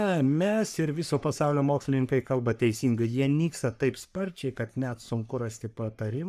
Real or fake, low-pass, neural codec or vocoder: fake; 14.4 kHz; codec, 44.1 kHz, 3.4 kbps, Pupu-Codec